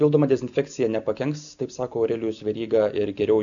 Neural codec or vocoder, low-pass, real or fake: none; 7.2 kHz; real